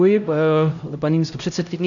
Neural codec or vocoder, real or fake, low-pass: codec, 16 kHz, 0.5 kbps, X-Codec, HuBERT features, trained on LibriSpeech; fake; 7.2 kHz